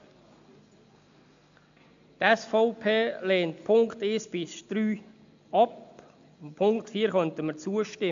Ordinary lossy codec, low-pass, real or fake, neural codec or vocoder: AAC, 64 kbps; 7.2 kHz; real; none